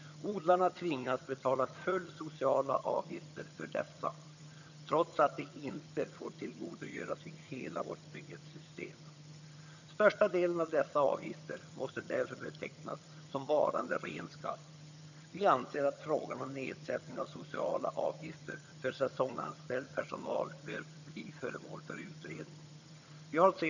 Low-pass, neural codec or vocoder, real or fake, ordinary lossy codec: 7.2 kHz; vocoder, 22.05 kHz, 80 mel bands, HiFi-GAN; fake; none